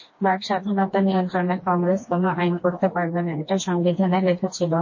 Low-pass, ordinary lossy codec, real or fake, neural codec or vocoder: 7.2 kHz; MP3, 32 kbps; fake; codec, 16 kHz, 2 kbps, FreqCodec, smaller model